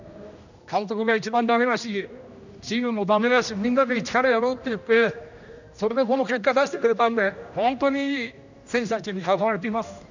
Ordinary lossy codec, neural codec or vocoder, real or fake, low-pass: none; codec, 16 kHz, 1 kbps, X-Codec, HuBERT features, trained on general audio; fake; 7.2 kHz